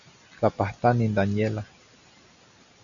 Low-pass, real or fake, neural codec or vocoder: 7.2 kHz; real; none